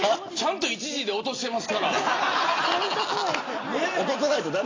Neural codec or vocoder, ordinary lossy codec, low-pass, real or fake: none; AAC, 32 kbps; 7.2 kHz; real